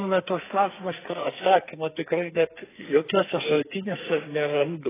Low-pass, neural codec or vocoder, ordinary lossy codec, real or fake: 3.6 kHz; codec, 44.1 kHz, 2.6 kbps, SNAC; AAC, 16 kbps; fake